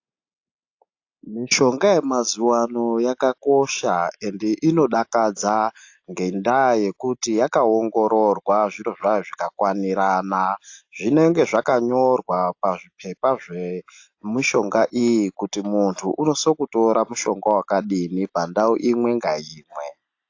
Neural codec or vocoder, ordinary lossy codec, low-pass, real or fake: none; AAC, 48 kbps; 7.2 kHz; real